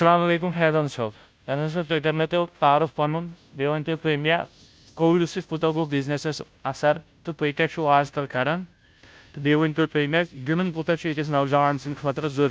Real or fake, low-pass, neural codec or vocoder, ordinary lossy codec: fake; none; codec, 16 kHz, 0.5 kbps, FunCodec, trained on Chinese and English, 25 frames a second; none